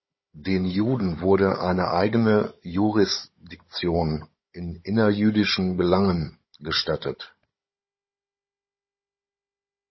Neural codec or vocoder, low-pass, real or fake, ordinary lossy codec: codec, 16 kHz, 16 kbps, FunCodec, trained on Chinese and English, 50 frames a second; 7.2 kHz; fake; MP3, 24 kbps